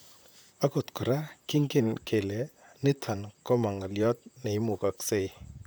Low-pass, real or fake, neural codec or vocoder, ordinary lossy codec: none; fake; vocoder, 44.1 kHz, 128 mel bands, Pupu-Vocoder; none